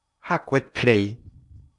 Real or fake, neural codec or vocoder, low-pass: fake; codec, 16 kHz in and 24 kHz out, 0.8 kbps, FocalCodec, streaming, 65536 codes; 10.8 kHz